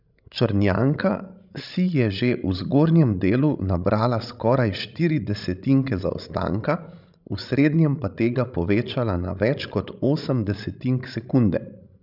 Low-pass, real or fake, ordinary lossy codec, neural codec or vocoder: 5.4 kHz; fake; none; codec, 16 kHz, 16 kbps, FreqCodec, larger model